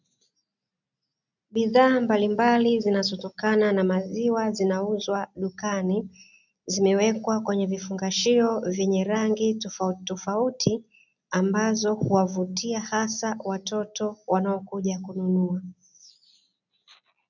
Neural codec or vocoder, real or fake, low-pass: none; real; 7.2 kHz